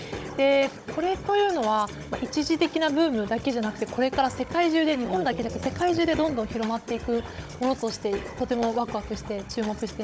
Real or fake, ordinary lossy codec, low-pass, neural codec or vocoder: fake; none; none; codec, 16 kHz, 16 kbps, FunCodec, trained on Chinese and English, 50 frames a second